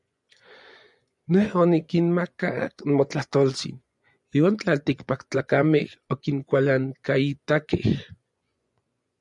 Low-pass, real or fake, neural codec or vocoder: 10.8 kHz; fake; vocoder, 24 kHz, 100 mel bands, Vocos